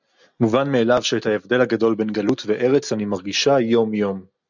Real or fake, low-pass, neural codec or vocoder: real; 7.2 kHz; none